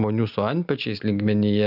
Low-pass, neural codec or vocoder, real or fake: 5.4 kHz; none; real